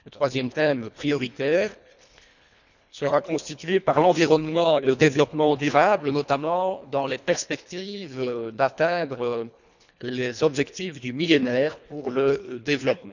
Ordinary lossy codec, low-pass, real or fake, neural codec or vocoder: none; 7.2 kHz; fake; codec, 24 kHz, 1.5 kbps, HILCodec